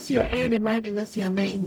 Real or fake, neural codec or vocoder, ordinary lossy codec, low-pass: fake; codec, 44.1 kHz, 0.9 kbps, DAC; none; none